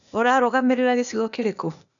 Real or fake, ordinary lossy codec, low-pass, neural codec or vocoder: fake; none; 7.2 kHz; codec, 16 kHz, 0.8 kbps, ZipCodec